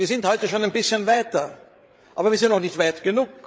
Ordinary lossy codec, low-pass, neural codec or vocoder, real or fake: none; none; codec, 16 kHz, 8 kbps, FreqCodec, larger model; fake